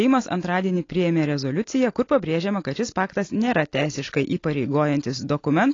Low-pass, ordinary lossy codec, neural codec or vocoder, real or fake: 7.2 kHz; AAC, 32 kbps; none; real